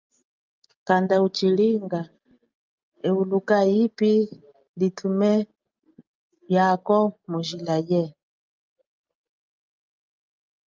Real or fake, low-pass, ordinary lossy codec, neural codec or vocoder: real; 7.2 kHz; Opus, 24 kbps; none